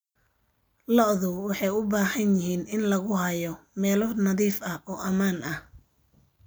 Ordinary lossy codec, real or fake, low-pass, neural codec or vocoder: none; real; none; none